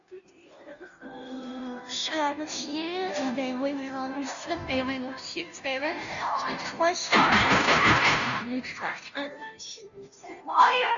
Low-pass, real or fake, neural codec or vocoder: 7.2 kHz; fake; codec, 16 kHz, 0.5 kbps, FunCodec, trained on Chinese and English, 25 frames a second